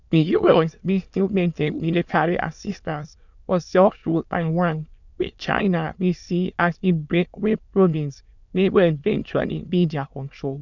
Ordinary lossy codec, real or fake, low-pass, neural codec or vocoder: none; fake; 7.2 kHz; autoencoder, 22.05 kHz, a latent of 192 numbers a frame, VITS, trained on many speakers